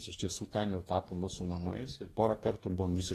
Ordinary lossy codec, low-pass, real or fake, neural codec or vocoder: AAC, 48 kbps; 14.4 kHz; fake; codec, 44.1 kHz, 2.6 kbps, DAC